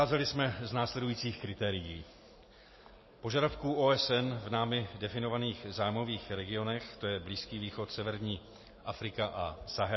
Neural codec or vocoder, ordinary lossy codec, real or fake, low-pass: none; MP3, 24 kbps; real; 7.2 kHz